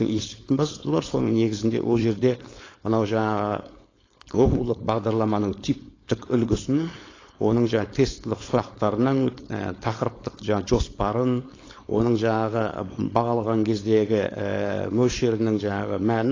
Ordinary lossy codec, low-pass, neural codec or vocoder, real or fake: AAC, 32 kbps; 7.2 kHz; codec, 16 kHz, 4.8 kbps, FACodec; fake